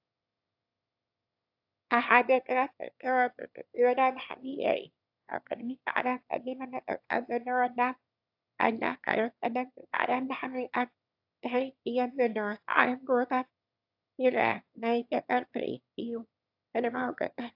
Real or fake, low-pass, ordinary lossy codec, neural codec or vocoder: fake; 5.4 kHz; AAC, 48 kbps; autoencoder, 22.05 kHz, a latent of 192 numbers a frame, VITS, trained on one speaker